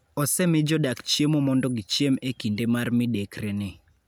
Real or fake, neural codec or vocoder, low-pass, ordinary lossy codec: real; none; none; none